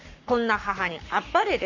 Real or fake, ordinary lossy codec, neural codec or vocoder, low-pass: fake; none; codec, 44.1 kHz, 7.8 kbps, Pupu-Codec; 7.2 kHz